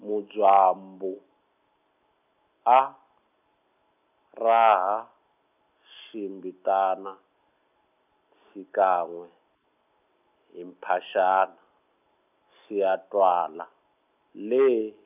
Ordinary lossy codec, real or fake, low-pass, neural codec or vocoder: none; real; 3.6 kHz; none